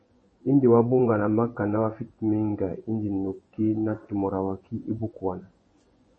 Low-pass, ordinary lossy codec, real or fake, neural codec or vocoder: 9.9 kHz; MP3, 32 kbps; fake; vocoder, 24 kHz, 100 mel bands, Vocos